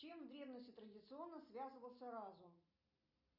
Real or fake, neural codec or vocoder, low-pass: real; none; 5.4 kHz